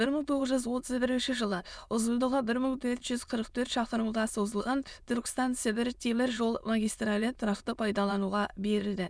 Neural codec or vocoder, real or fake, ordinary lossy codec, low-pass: autoencoder, 22.05 kHz, a latent of 192 numbers a frame, VITS, trained on many speakers; fake; none; none